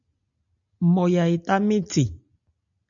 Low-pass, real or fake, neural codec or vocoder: 7.2 kHz; real; none